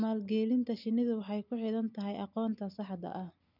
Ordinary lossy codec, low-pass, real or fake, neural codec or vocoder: none; 5.4 kHz; real; none